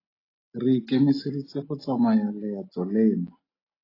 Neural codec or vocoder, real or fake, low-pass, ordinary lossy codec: none; real; 5.4 kHz; AAC, 24 kbps